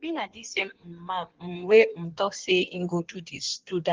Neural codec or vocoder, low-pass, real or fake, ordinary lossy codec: codec, 16 kHz in and 24 kHz out, 1.1 kbps, FireRedTTS-2 codec; 7.2 kHz; fake; Opus, 16 kbps